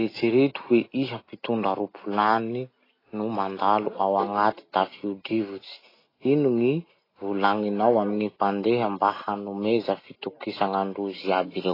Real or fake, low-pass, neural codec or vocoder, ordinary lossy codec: real; 5.4 kHz; none; AAC, 24 kbps